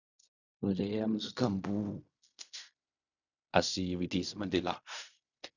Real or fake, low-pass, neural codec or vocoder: fake; 7.2 kHz; codec, 16 kHz in and 24 kHz out, 0.4 kbps, LongCat-Audio-Codec, fine tuned four codebook decoder